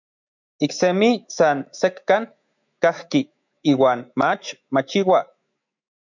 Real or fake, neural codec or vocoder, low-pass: fake; autoencoder, 48 kHz, 128 numbers a frame, DAC-VAE, trained on Japanese speech; 7.2 kHz